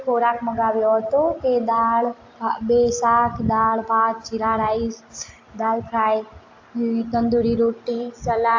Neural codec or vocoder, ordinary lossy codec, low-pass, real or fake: none; AAC, 48 kbps; 7.2 kHz; real